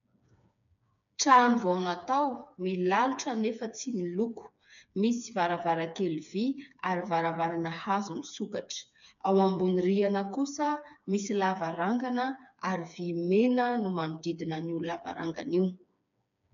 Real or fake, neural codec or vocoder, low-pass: fake; codec, 16 kHz, 4 kbps, FreqCodec, smaller model; 7.2 kHz